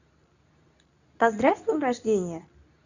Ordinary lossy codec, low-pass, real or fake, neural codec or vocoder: none; 7.2 kHz; fake; codec, 24 kHz, 0.9 kbps, WavTokenizer, medium speech release version 2